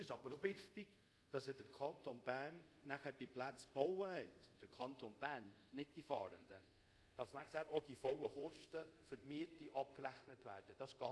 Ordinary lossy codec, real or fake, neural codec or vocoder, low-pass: none; fake; codec, 24 kHz, 0.5 kbps, DualCodec; none